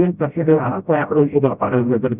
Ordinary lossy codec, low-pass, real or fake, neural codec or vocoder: Opus, 64 kbps; 3.6 kHz; fake; codec, 16 kHz, 0.5 kbps, FreqCodec, smaller model